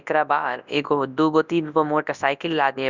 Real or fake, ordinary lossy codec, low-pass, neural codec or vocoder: fake; none; 7.2 kHz; codec, 24 kHz, 0.9 kbps, WavTokenizer, large speech release